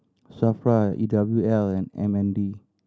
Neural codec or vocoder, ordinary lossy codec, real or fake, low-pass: none; none; real; none